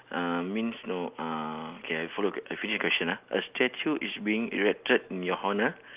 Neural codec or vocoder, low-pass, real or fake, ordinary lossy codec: none; 3.6 kHz; real; Opus, 24 kbps